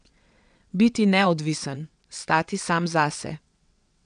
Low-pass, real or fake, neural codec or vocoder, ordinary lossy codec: 9.9 kHz; fake; vocoder, 22.05 kHz, 80 mel bands, WaveNeXt; none